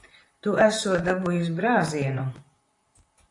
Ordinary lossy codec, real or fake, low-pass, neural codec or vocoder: AAC, 48 kbps; fake; 10.8 kHz; vocoder, 44.1 kHz, 128 mel bands, Pupu-Vocoder